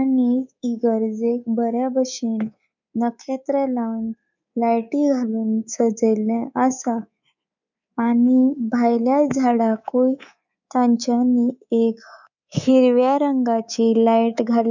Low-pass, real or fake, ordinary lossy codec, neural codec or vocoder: 7.2 kHz; fake; none; codec, 24 kHz, 3.1 kbps, DualCodec